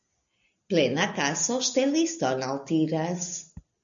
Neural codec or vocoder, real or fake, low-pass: none; real; 7.2 kHz